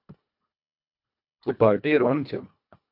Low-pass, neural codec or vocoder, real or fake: 5.4 kHz; codec, 24 kHz, 1.5 kbps, HILCodec; fake